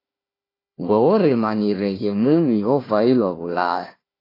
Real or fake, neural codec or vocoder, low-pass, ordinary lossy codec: fake; codec, 16 kHz, 1 kbps, FunCodec, trained on Chinese and English, 50 frames a second; 5.4 kHz; AAC, 24 kbps